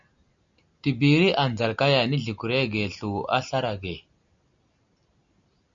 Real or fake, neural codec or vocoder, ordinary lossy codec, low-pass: real; none; MP3, 64 kbps; 7.2 kHz